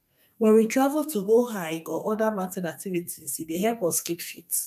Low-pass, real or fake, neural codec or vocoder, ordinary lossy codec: 14.4 kHz; fake; codec, 32 kHz, 1.9 kbps, SNAC; none